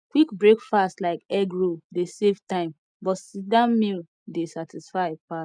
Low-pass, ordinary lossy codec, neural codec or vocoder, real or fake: 9.9 kHz; none; none; real